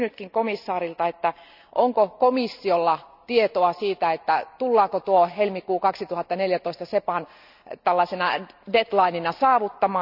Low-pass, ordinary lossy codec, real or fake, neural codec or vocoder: 5.4 kHz; none; real; none